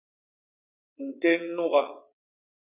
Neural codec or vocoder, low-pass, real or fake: vocoder, 22.05 kHz, 80 mel bands, WaveNeXt; 3.6 kHz; fake